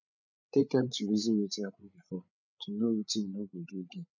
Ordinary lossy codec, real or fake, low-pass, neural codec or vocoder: none; fake; 7.2 kHz; codec, 16 kHz, 8 kbps, FreqCodec, larger model